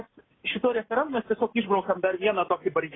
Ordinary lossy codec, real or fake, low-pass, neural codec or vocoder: AAC, 16 kbps; real; 7.2 kHz; none